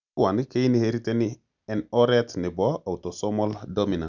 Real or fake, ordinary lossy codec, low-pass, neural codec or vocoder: real; none; 7.2 kHz; none